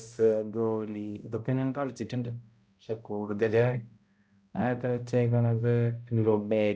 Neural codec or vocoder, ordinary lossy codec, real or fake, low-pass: codec, 16 kHz, 0.5 kbps, X-Codec, HuBERT features, trained on balanced general audio; none; fake; none